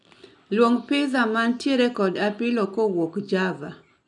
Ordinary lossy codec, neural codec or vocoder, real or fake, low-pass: none; none; real; 10.8 kHz